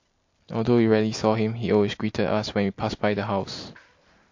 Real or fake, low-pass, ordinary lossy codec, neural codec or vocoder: real; 7.2 kHz; MP3, 48 kbps; none